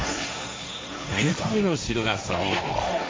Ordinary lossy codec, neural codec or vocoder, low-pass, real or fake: none; codec, 16 kHz, 1.1 kbps, Voila-Tokenizer; none; fake